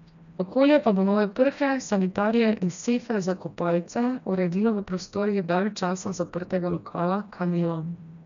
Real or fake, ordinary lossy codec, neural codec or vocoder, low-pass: fake; none; codec, 16 kHz, 1 kbps, FreqCodec, smaller model; 7.2 kHz